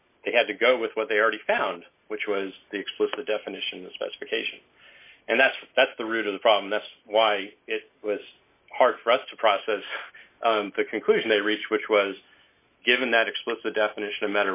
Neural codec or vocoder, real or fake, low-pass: none; real; 3.6 kHz